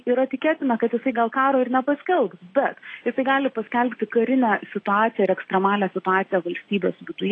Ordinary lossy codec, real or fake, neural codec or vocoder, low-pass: AAC, 32 kbps; real; none; 9.9 kHz